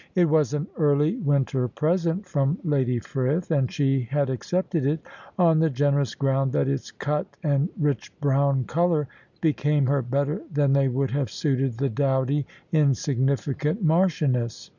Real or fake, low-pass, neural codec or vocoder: real; 7.2 kHz; none